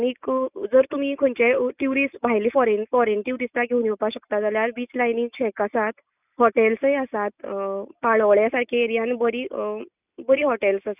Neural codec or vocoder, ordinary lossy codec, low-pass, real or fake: none; none; 3.6 kHz; real